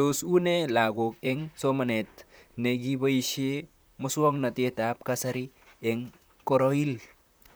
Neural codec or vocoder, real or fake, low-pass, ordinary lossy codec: vocoder, 44.1 kHz, 128 mel bands, Pupu-Vocoder; fake; none; none